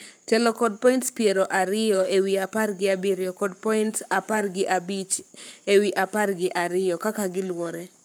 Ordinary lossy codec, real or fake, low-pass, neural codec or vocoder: none; fake; none; codec, 44.1 kHz, 7.8 kbps, Pupu-Codec